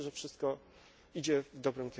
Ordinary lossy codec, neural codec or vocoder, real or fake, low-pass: none; none; real; none